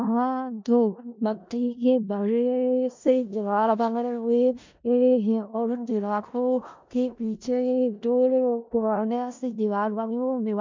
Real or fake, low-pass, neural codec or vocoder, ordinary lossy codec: fake; 7.2 kHz; codec, 16 kHz in and 24 kHz out, 0.4 kbps, LongCat-Audio-Codec, four codebook decoder; MP3, 64 kbps